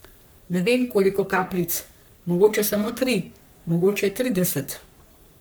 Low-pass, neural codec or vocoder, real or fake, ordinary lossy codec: none; codec, 44.1 kHz, 3.4 kbps, Pupu-Codec; fake; none